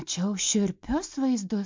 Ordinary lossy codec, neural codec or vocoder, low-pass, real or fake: MP3, 64 kbps; none; 7.2 kHz; real